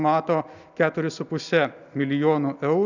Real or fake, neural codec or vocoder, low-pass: real; none; 7.2 kHz